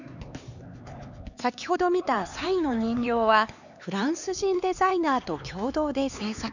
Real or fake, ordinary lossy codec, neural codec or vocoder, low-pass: fake; none; codec, 16 kHz, 4 kbps, X-Codec, HuBERT features, trained on LibriSpeech; 7.2 kHz